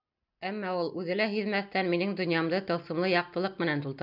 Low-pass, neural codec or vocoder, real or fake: 5.4 kHz; none; real